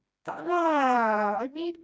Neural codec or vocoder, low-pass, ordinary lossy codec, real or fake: codec, 16 kHz, 1 kbps, FreqCodec, smaller model; none; none; fake